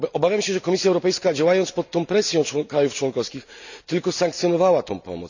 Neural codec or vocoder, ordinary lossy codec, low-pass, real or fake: none; none; 7.2 kHz; real